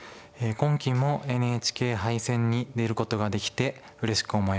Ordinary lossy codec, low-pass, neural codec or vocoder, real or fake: none; none; none; real